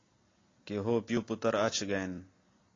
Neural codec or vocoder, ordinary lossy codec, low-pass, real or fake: none; AAC, 32 kbps; 7.2 kHz; real